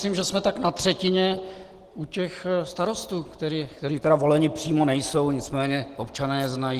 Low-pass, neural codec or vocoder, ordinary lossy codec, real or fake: 14.4 kHz; none; Opus, 16 kbps; real